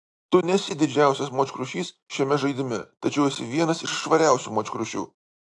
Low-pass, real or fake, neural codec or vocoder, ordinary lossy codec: 10.8 kHz; real; none; AAC, 64 kbps